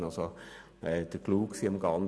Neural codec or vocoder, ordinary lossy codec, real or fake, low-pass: none; none; real; none